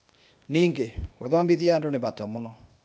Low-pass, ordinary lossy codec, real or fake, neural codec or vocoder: none; none; fake; codec, 16 kHz, 0.8 kbps, ZipCodec